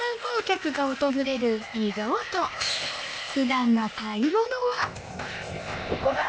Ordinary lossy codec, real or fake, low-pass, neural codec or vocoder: none; fake; none; codec, 16 kHz, 0.8 kbps, ZipCodec